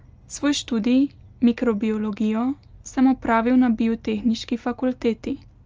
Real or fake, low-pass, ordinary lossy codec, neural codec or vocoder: real; 7.2 kHz; Opus, 24 kbps; none